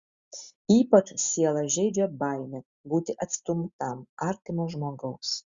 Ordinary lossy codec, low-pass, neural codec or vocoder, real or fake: Opus, 64 kbps; 7.2 kHz; none; real